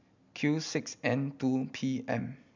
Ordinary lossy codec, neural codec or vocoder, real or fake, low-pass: MP3, 64 kbps; none; real; 7.2 kHz